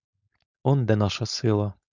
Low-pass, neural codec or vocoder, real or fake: 7.2 kHz; codec, 16 kHz, 4.8 kbps, FACodec; fake